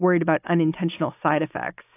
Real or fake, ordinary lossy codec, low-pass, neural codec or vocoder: real; AAC, 32 kbps; 3.6 kHz; none